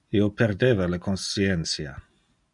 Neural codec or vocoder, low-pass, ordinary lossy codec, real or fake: none; 10.8 kHz; MP3, 96 kbps; real